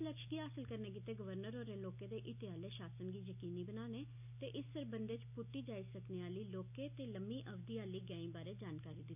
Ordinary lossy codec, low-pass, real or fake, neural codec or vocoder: none; 3.6 kHz; real; none